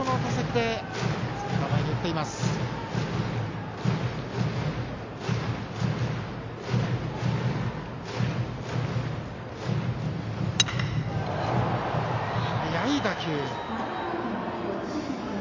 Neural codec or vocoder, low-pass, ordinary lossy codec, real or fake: none; 7.2 kHz; MP3, 48 kbps; real